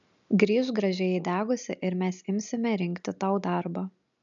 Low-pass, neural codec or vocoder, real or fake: 7.2 kHz; none; real